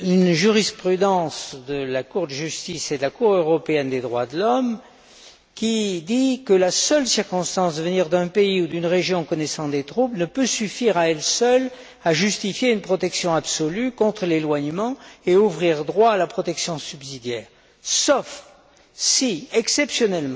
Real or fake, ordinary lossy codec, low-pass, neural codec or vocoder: real; none; none; none